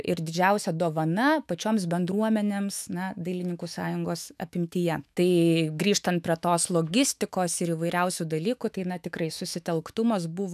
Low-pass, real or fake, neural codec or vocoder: 14.4 kHz; fake; autoencoder, 48 kHz, 128 numbers a frame, DAC-VAE, trained on Japanese speech